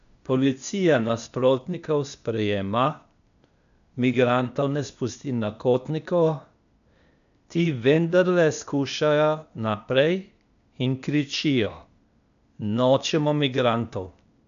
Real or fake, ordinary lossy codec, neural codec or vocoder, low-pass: fake; none; codec, 16 kHz, 0.8 kbps, ZipCodec; 7.2 kHz